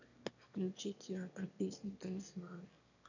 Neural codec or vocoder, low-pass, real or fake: autoencoder, 22.05 kHz, a latent of 192 numbers a frame, VITS, trained on one speaker; 7.2 kHz; fake